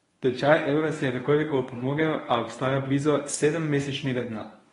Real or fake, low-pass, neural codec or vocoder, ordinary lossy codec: fake; 10.8 kHz; codec, 24 kHz, 0.9 kbps, WavTokenizer, medium speech release version 1; AAC, 32 kbps